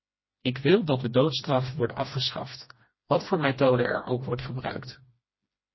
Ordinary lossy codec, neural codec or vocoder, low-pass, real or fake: MP3, 24 kbps; codec, 16 kHz, 1 kbps, FreqCodec, smaller model; 7.2 kHz; fake